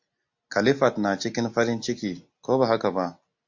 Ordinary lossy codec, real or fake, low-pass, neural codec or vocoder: MP3, 48 kbps; real; 7.2 kHz; none